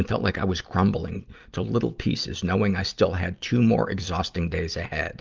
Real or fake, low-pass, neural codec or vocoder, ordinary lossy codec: real; 7.2 kHz; none; Opus, 24 kbps